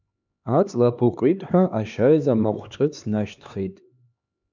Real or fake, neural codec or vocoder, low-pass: fake; codec, 16 kHz, 2 kbps, X-Codec, HuBERT features, trained on LibriSpeech; 7.2 kHz